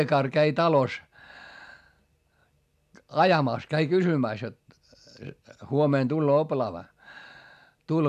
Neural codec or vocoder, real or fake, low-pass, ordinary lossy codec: none; real; 14.4 kHz; MP3, 96 kbps